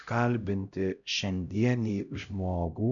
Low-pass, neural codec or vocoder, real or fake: 7.2 kHz; codec, 16 kHz, 0.5 kbps, X-Codec, HuBERT features, trained on LibriSpeech; fake